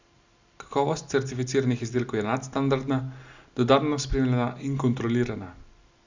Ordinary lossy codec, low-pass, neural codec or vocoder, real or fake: Opus, 64 kbps; 7.2 kHz; none; real